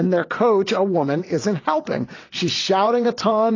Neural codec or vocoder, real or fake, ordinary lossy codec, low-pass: vocoder, 22.05 kHz, 80 mel bands, WaveNeXt; fake; AAC, 32 kbps; 7.2 kHz